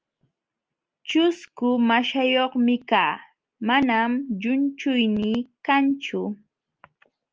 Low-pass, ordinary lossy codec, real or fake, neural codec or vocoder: 7.2 kHz; Opus, 24 kbps; real; none